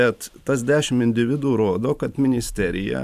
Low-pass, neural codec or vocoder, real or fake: 14.4 kHz; vocoder, 44.1 kHz, 128 mel bands every 512 samples, BigVGAN v2; fake